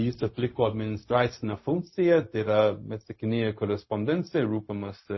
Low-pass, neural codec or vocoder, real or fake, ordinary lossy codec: 7.2 kHz; codec, 16 kHz, 0.4 kbps, LongCat-Audio-Codec; fake; MP3, 24 kbps